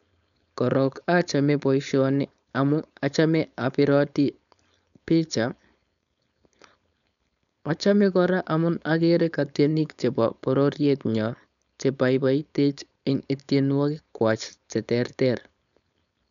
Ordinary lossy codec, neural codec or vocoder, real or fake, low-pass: none; codec, 16 kHz, 4.8 kbps, FACodec; fake; 7.2 kHz